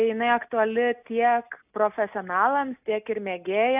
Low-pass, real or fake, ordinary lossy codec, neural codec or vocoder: 3.6 kHz; real; AAC, 32 kbps; none